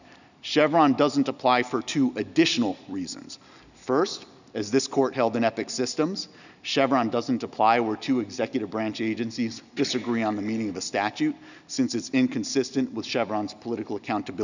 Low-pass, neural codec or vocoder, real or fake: 7.2 kHz; none; real